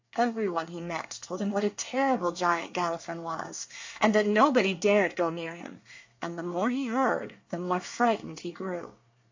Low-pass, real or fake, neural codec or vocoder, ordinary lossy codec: 7.2 kHz; fake; codec, 24 kHz, 1 kbps, SNAC; AAC, 48 kbps